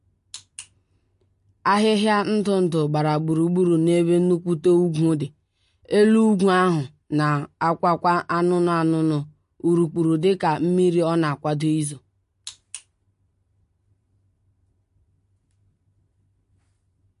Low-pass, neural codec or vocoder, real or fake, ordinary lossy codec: 10.8 kHz; none; real; MP3, 48 kbps